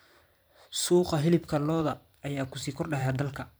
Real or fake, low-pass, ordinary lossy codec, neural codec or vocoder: fake; none; none; vocoder, 44.1 kHz, 128 mel bands every 512 samples, BigVGAN v2